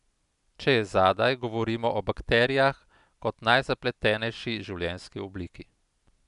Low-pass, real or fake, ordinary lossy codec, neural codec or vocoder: 10.8 kHz; real; none; none